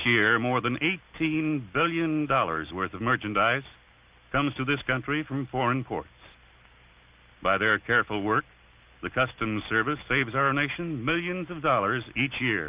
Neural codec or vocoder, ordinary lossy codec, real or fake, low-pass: none; Opus, 24 kbps; real; 3.6 kHz